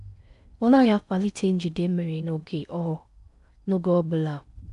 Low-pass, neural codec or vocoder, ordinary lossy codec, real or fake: 10.8 kHz; codec, 16 kHz in and 24 kHz out, 0.6 kbps, FocalCodec, streaming, 4096 codes; none; fake